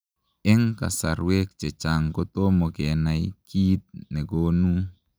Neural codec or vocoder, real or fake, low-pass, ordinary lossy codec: none; real; none; none